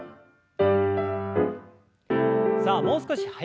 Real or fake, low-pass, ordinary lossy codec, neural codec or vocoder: real; none; none; none